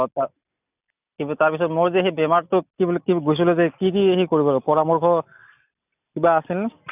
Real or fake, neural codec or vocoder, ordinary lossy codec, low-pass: real; none; none; 3.6 kHz